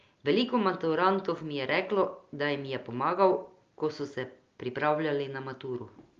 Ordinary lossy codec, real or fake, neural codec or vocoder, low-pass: Opus, 32 kbps; real; none; 7.2 kHz